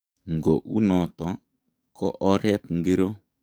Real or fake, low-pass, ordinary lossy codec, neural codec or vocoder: fake; none; none; codec, 44.1 kHz, 7.8 kbps, DAC